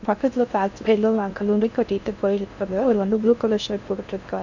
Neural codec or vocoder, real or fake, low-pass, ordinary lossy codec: codec, 16 kHz in and 24 kHz out, 0.6 kbps, FocalCodec, streaming, 4096 codes; fake; 7.2 kHz; none